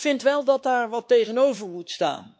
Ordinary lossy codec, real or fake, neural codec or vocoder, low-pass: none; fake; codec, 16 kHz, 2 kbps, X-Codec, WavLM features, trained on Multilingual LibriSpeech; none